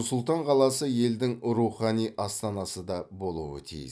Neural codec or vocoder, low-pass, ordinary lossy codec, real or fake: none; none; none; real